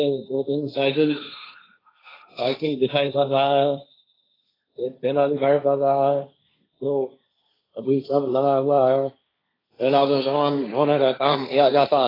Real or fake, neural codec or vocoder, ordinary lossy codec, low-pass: fake; codec, 16 kHz, 1.1 kbps, Voila-Tokenizer; AAC, 24 kbps; 5.4 kHz